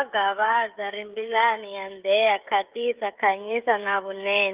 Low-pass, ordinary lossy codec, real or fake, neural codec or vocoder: 3.6 kHz; Opus, 24 kbps; fake; codec, 16 kHz, 16 kbps, FreqCodec, smaller model